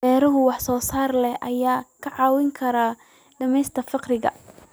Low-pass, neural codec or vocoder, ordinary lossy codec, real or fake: none; none; none; real